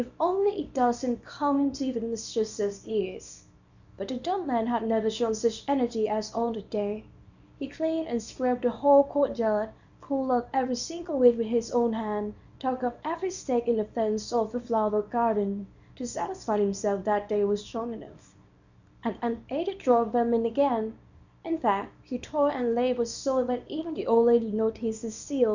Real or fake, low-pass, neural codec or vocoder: fake; 7.2 kHz; codec, 24 kHz, 0.9 kbps, WavTokenizer, small release